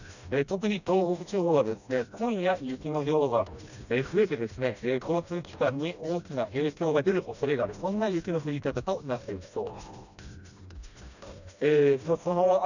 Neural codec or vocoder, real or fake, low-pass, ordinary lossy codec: codec, 16 kHz, 1 kbps, FreqCodec, smaller model; fake; 7.2 kHz; none